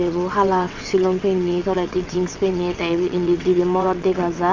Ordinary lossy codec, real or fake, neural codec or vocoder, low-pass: AAC, 48 kbps; fake; vocoder, 22.05 kHz, 80 mel bands, WaveNeXt; 7.2 kHz